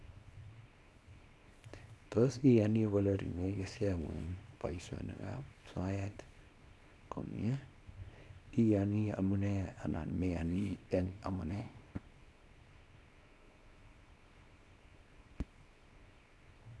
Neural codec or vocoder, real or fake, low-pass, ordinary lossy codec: codec, 24 kHz, 0.9 kbps, WavTokenizer, small release; fake; none; none